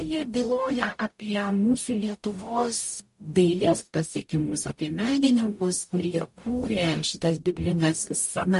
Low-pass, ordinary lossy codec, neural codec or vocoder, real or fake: 14.4 kHz; MP3, 48 kbps; codec, 44.1 kHz, 0.9 kbps, DAC; fake